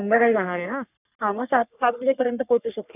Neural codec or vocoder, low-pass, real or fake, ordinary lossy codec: codec, 44.1 kHz, 3.4 kbps, Pupu-Codec; 3.6 kHz; fake; none